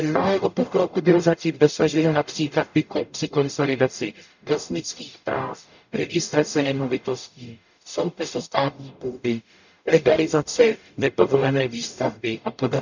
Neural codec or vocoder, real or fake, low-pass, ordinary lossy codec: codec, 44.1 kHz, 0.9 kbps, DAC; fake; 7.2 kHz; none